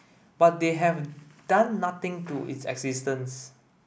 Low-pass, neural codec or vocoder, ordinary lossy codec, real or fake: none; none; none; real